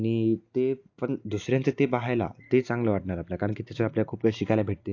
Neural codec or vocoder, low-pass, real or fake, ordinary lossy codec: none; 7.2 kHz; real; AAC, 48 kbps